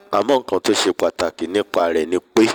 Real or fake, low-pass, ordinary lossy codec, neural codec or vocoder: fake; 19.8 kHz; none; vocoder, 44.1 kHz, 128 mel bands every 256 samples, BigVGAN v2